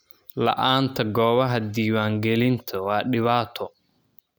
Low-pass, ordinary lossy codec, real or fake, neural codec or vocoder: none; none; real; none